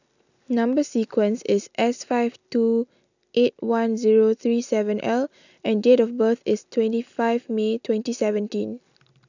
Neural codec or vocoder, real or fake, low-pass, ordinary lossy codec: none; real; 7.2 kHz; none